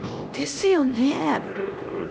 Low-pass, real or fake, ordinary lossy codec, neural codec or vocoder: none; fake; none; codec, 16 kHz, 1 kbps, X-Codec, HuBERT features, trained on LibriSpeech